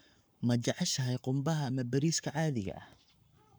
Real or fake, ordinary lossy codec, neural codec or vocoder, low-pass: fake; none; codec, 44.1 kHz, 7.8 kbps, Pupu-Codec; none